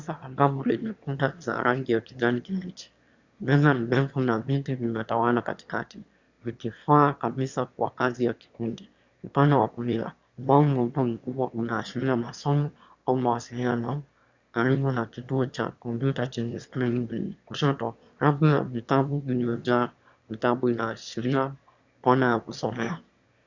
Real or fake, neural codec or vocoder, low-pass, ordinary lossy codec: fake; autoencoder, 22.05 kHz, a latent of 192 numbers a frame, VITS, trained on one speaker; 7.2 kHz; Opus, 64 kbps